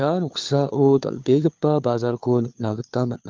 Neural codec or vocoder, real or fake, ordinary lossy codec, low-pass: codec, 16 kHz, 4 kbps, FunCodec, trained on LibriTTS, 50 frames a second; fake; Opus, 32 kbps; 7.2 kHz